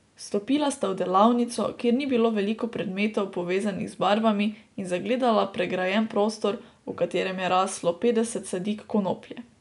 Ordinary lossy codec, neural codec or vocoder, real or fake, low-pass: none; none; real; 10.8 kHz